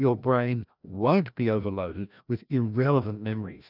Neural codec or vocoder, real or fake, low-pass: codec, 16 kHz, 1 kbps, FreqCodec, larger model; fake; 5.4 kHz